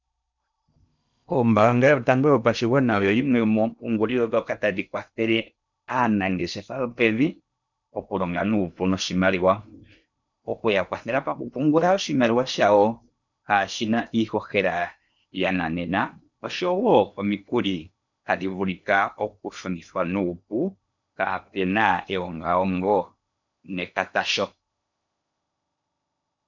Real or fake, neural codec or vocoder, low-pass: fake; codec, 16 kHz in and 24 kHz out, 0.8 kbps, FocalCodec, streaming, 65536 codes; 7.2 kHz